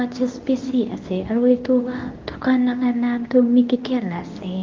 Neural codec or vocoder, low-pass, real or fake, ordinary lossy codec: codec, 24 kHz, 0.9 kbps, WavTokenizer, medium speech release version 2; 7.2 kHz; fake; Opus, 32 kbps